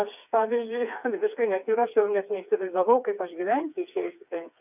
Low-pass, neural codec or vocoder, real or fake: 3.6 kHz; codec, 16 kHz, 4 kbps, FreqCodec, smaller model; fake